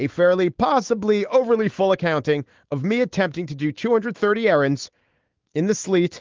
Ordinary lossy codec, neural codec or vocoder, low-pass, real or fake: Opus, 16 kbps; autoencoder, 48 kHz, 128 numbers a frame, DAC-VAE, trained on Japanese speech; 7.2 kHz; fake